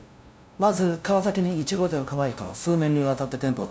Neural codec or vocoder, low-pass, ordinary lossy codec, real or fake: codec, 16 kHz, 0.5 kbps, FunCodec, trained on LibriTTS, 25 frames a second; none; none; fake